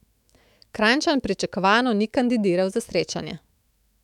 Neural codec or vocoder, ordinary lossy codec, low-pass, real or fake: autoencoder, 48 kHz, 128 numbers a frame, DAC-VAE, trained on Japanese speech; none; 19.8 kHz; fake